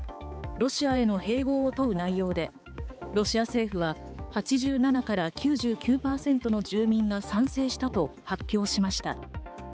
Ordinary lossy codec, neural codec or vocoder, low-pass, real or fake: none; codec, 16 kHz, 4 kbps, X-Codec, HuBERT features, trained on balanced general audio; none; fake